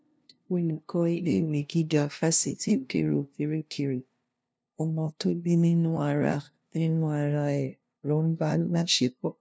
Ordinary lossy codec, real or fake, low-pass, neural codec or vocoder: none; fake; none; codec, 16 kHz, 0.5 kbps, FunCodec, trained on LibriTTS, 25 frames a second